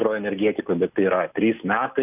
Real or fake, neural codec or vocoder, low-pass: real; none; 3.6 kHz